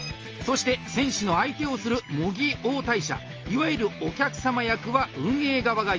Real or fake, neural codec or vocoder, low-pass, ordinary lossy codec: real; none; 7.2 kHz; Opus, 24 kbps